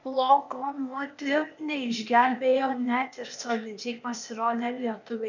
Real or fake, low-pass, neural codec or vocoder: fake; 7.2 kHz; codec, 16 kHz, 0.8 kbps, ZipCodec